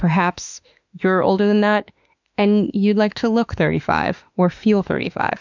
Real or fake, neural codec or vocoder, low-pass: fake; autoencoder, 48 kHz, 32 numbers a frame, DAC-VAE, trained on Japanese speech; 7.2 kHz